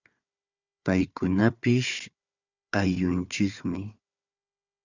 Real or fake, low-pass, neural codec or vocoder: fake; 7.2 kHz; codec, 16 kHz, 4 kbps, FunCodec, trained on Chinese and English, 50 frames a second